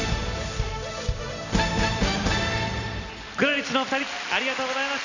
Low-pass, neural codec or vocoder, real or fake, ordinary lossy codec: 7.2 kHz; none; real; none